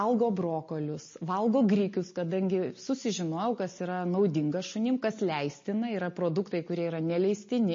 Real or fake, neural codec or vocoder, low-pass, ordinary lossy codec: real; none; 7.2 kHz; MP3, 32 kbps